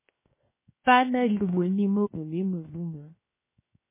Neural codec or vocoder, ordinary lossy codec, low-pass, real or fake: codec, 16 kHz, 0.7 kbps, FocalCodec; MP3, 16 kbps; 3.6 kHz; fake